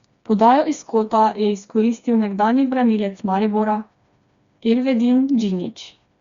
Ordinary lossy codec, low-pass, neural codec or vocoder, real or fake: Opus, 64 kbps; 7.2 kHz; codec, 16 kHz, 2 kbps, FreqCodec, smaller model; fake